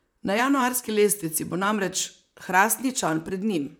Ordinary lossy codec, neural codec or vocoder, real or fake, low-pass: none; vocoder, 44.1 kHz, 128 mel bands, Pupu-Vocoder; fake; none